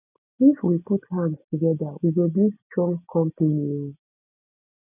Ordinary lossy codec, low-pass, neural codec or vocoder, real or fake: none; 3.6 kHz; none; real